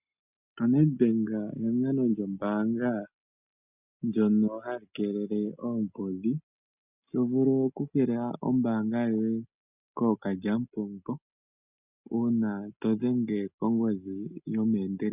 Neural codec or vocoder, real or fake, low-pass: none; real; 3.6 kHz